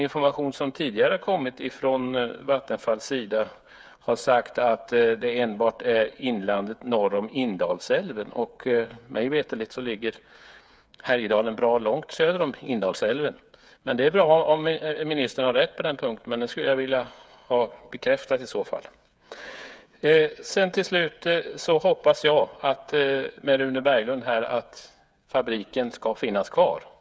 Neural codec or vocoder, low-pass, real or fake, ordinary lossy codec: codec, 16 kHz, 8 kbps, FreqCodec, smaller model; none; fake; none